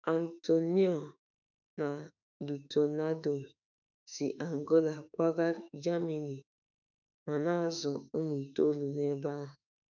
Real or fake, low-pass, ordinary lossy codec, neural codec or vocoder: fake; 7.2 kHz; none; autoencoder, 48 kHz, 32 numbers a frame, DAC-VAE, trained on Japanese speech